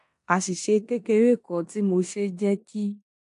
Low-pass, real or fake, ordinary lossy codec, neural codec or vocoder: 10.8 kHz; fake; AAC, 64 kbps; codec, 16 kHz in and 24 kHz out, 0.9 kbps, LongCat-Audio-Codec, four codebook decoder